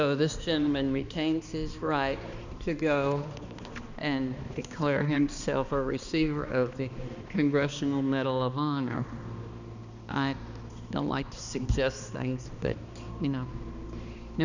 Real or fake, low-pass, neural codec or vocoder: fake; 7.2 kHz; codec, 16 kHz, 2 kbps, X-Codec, HuBERT features, trained on balanced general audio